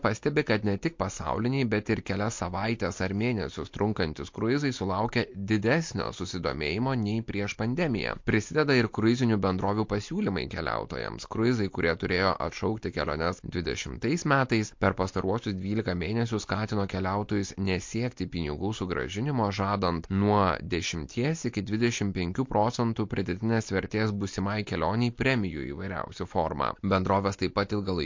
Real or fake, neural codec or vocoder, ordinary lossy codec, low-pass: real; none; MP3, 48 kbps; 7.2 kHz